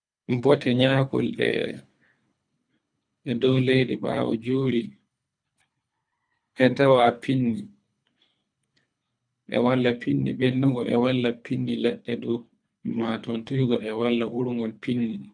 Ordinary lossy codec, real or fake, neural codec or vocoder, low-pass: none; fake; codec, 24 kHz, 3 kbps, HILCodec; 9.9 kHz